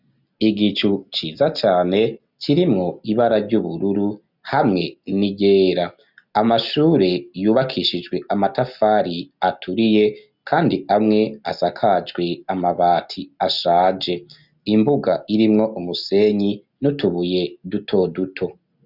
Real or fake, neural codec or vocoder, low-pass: real; none; 5.4 kHz